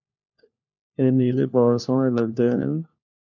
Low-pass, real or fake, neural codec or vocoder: 7.2 kHz; fake; codec, 16 kHz, 1 kbps, FunCodec, trained on LibriTTS, 50 frames a second